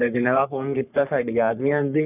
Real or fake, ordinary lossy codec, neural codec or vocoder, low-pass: fake; none; codec, 44.1 kHz, 3.4 kbps, Pupu-Codec; 3.6 kHz